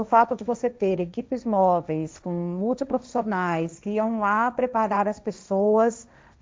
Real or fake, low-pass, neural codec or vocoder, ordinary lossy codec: fake; none; codec, 16 kHz, 1.1 kbps, Voila-Tokenizer; none